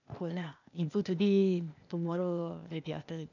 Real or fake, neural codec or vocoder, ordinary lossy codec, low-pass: fake; codec, 16 kHz, 0.8 kbps, ZipCodec; none; 7.2 kHz